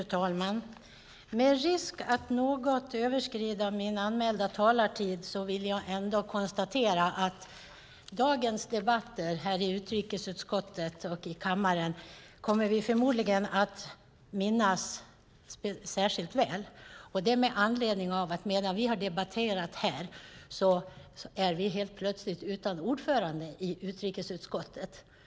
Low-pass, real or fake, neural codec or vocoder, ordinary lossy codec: none; real; none; none